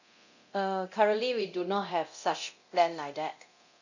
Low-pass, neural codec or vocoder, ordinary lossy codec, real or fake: 7.2 kHz; codec, 24 kHz, 0.9 kbps, DualCodec; none; fake